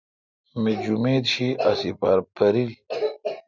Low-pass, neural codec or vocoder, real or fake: 7.2 kHz; none; real